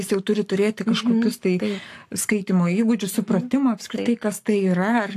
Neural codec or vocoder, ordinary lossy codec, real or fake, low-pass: codec, 44.1 kHz, 7.8 kbps, Pupu-Codec; AAC, 64 kbps; fake; 14.4 kHz